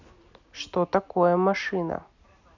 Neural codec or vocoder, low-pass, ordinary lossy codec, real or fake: none; 7.2 kHz; none; real